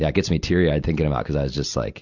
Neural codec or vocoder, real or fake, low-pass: none; real; 7.2 kHz